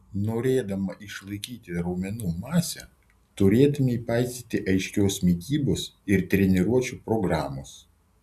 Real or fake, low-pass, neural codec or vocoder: real; 14.4 kHz; none